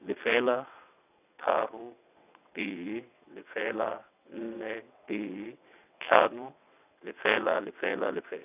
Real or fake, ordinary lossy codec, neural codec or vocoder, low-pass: fake; none; vocoder, 22.05 kHz, 80 mel bands, WaveNeXt; 3.6 kHz